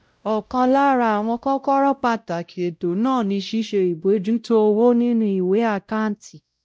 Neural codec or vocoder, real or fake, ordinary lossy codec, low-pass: codec, 16 kHz, 0.5 kbps, X-Codec, WavLM features, trained on Multilingual LibriSpeech; fake; none; none